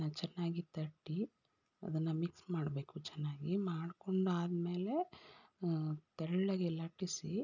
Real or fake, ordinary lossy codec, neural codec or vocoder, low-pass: real; none; none; 7.2 kHz